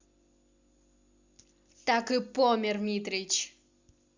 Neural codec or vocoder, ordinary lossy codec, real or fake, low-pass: none; Opus, 64 kbps; real; 7.2 kHz